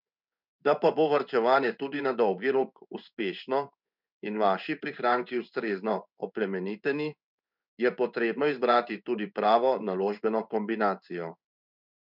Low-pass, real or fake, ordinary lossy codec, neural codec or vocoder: 5.4 kHz; fake; none; codec, 16 kHz in and 24 kHz out, 1 kbps, XY-Tokenizer